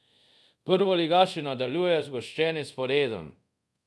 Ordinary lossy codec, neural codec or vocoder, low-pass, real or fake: none; codec, 24 kHz, 0.5 kbps, DualCodec; none; fake